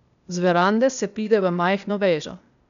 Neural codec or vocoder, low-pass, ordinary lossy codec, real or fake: codec, 16 kHz, 0.8 kbps, ZipCodec; 7.2 kHz; none; fake